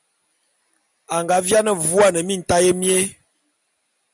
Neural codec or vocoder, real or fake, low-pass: none; real; 10.8 kHz